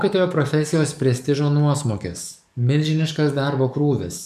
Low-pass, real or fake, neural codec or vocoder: 14.4 kHz; fake; codec, 44.1 kHz, 7.8 kbps, DAC